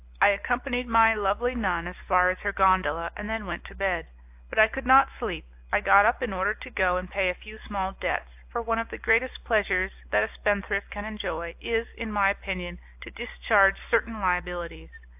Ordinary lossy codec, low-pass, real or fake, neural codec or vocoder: AAC, 32 kbps; 3.6 kHz; real; none